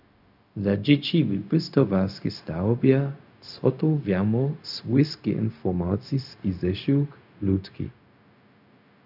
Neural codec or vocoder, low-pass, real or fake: codec, 16 kHz, 0.4 kbps, LongCat-Audio-Codec; 5.4 kHz; fake